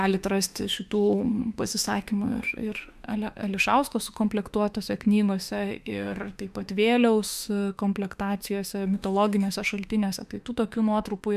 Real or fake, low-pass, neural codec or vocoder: fake; 14.4 kHz; autoencoder, 48 kHz, 32 numbers a frame, DAC-VAE, trained on Japanese speech